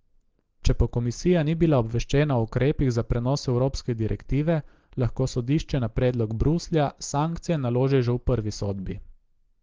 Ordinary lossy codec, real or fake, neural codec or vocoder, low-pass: Opus, 16 kbps; real; none; 7.2 kHz